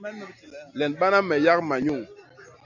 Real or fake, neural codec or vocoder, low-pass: real; none; 7.2 kHz